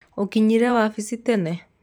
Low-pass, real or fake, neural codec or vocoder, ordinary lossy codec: 19.8 kHz; fake; vocoder, 44.1 kHz, 128 mel bands every 512 samples, BigVGAN v2; none